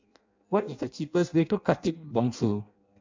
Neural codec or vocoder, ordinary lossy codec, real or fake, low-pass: codec, 16 kHz in and 24 kHz out, 0.6 kbps, FireRedTTS-2 codec; MP3, 64 kbps; fake; 7.2 kHz